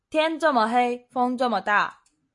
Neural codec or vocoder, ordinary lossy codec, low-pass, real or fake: none; MP3, 48 kbps; 10.8 kHz; real